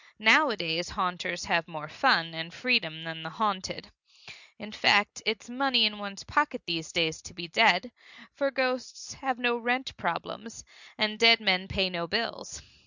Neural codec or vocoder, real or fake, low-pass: none; real; 7.2 kHz